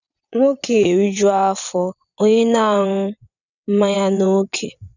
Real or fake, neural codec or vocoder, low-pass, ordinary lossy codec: fake; vocoder, 22.05 kHz, 80 mel bands, WaveNeXt; 7.2 kHz; none